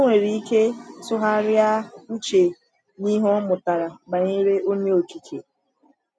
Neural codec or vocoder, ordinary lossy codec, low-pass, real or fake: none; none; none; real